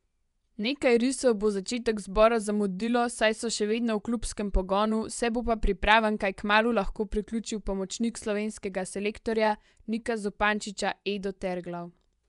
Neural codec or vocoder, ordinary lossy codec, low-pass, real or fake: vocoder, 24 kHz, 100 mel bands, Vocos; none; 10.8 kHz; fake